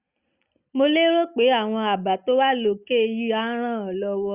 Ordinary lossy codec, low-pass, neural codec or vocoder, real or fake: Opus, 64 kbps; 3.6 kHz; none; real